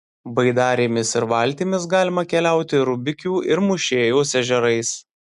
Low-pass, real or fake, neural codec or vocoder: 10.8 kHz; real; none